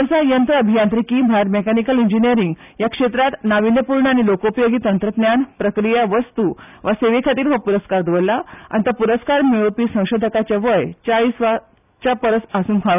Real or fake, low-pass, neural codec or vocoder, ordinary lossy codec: real; 3.6 kHz; none; none